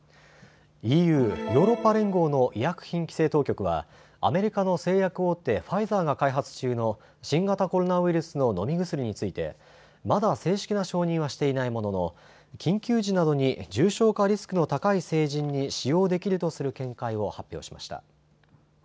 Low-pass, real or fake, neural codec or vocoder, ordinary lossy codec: none; real; none; none